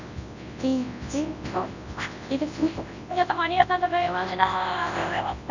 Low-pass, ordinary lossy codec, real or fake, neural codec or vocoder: 7.2 kHz; none; fake; codec, 24 kHz, 0.9 kbps, WavTokenizer, large speech release